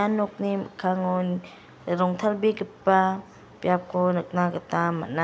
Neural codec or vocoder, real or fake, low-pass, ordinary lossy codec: none; real; none; none